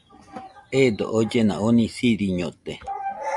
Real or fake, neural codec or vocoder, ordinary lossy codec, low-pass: real; none; MP3, 96 kbps; 10.8 kHz